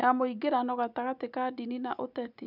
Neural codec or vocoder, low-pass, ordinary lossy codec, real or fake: none; 5.4 kHz; none; real